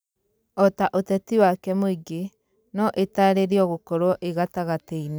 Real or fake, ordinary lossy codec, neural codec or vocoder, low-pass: real; none; none; none